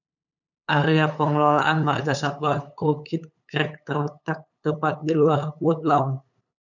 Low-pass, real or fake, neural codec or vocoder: 7.2 kHz; fake; codec, 16 kHz, 8 kbps, FunCodec, trained on LibriTTS, 25 frames a second